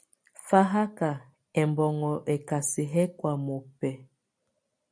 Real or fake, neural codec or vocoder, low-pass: real; none; 9.9 kHz